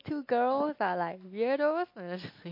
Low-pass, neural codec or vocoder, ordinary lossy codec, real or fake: 5.4 kHz; none; MP3, 32 kbps; real